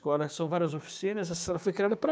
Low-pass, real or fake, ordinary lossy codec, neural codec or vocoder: none; fake; none; codec, 16 kHz, 6 kbps, DAC